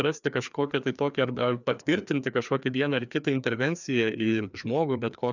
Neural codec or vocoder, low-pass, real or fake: codec, 16 kHz, 2 kbps, FreqCodec, larger model; 7.2 kHz; fake